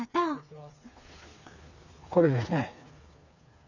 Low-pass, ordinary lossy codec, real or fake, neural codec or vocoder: 7.2 kHz; none; fake; codec, 16 kHz, 4 kbps, FreqCodec, smaller model